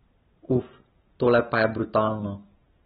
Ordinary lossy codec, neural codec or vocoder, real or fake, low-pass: AAC, 16 kbps; none; real; 19.8 kHz